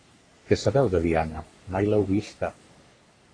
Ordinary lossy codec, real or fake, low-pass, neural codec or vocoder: AAC, 32 kbps; fake; 9.9 kHz; codec, 44.1 kHz, 3.4 kbps, Pupu-Codec